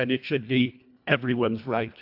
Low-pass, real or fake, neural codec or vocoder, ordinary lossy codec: 5.4 kHz; fake; codec, 24 kHz, 1.5 kbps, HILCodec; AAC, 48 kbps